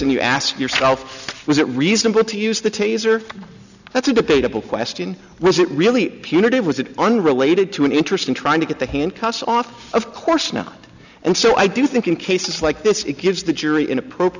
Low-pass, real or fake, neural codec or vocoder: 7.2 kHz; real; none